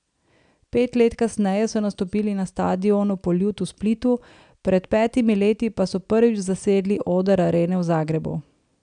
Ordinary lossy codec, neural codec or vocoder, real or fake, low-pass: none; none; real; 9.9 kHz